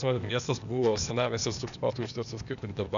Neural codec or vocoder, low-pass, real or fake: codec, 16 kHz, 0.8 kbps, ZipCodec; 7.2 kHz; fake